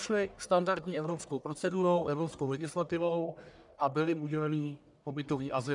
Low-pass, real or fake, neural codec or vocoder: 10.8 kHz; fake; codec, 44.1 kHz, 1.7 kbps, Pupu-Codec